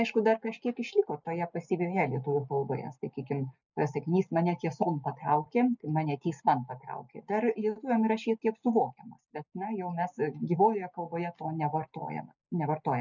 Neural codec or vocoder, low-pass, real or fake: none; 7.2 kHz; real